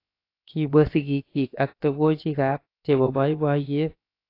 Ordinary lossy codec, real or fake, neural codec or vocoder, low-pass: AAC, 32 kbps; fake; codec, 16 kHz, 0.7 kbps, FocalCodec; 5.4 kHz